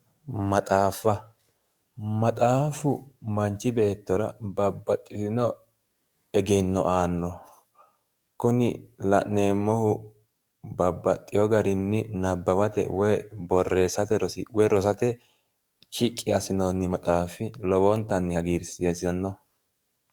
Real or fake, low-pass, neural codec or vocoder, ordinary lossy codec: fake; 19.8 kHz; codec, 44.1 kHz, 7.8 kbps, DAC; Opus, 64 kbps